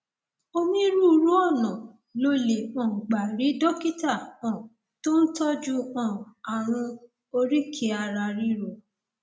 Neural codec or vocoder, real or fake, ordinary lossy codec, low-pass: none; real; none; none